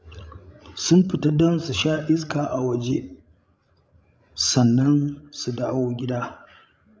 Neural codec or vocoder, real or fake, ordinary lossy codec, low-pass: codec, 16 kHz, 16 kbps, FreqCodec, larger model; fake; none; none